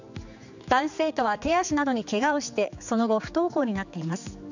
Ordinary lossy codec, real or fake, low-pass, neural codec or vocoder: none; fake; 7.2 kHz; codec, 16 kHz, 4 kbps, X-Codec, HuBERT features, trained on general audio